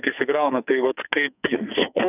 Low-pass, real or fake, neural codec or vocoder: 3.6 kHz; fake; codec, 44.1 kHz, 2.6 kbps, SNAC